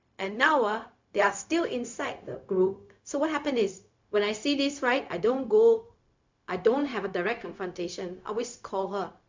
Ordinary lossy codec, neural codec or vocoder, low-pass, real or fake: MP3, 64 kbps; codec, 16 kHz, 0.4 kbps, LongCat-Audio-Codec; 7.2 kHz; fake